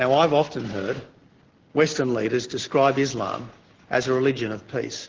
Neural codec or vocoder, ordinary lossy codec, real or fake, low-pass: none; Opus, 16 kbps; real; 7.2 kHz